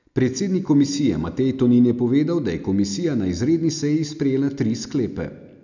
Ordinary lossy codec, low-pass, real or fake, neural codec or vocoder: none; 7.2 kHz; real; none